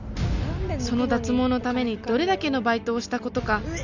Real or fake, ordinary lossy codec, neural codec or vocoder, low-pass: real; none; none; 7.2 kHz